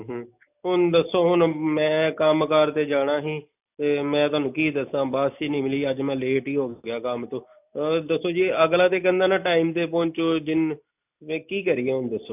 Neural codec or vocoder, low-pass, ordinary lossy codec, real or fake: none; 3.6 kHz; none; real